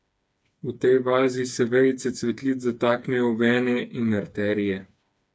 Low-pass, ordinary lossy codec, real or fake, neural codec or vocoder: none; none; fake; codec, 16 kHz, 4 kbps, FreqCodec, smaller model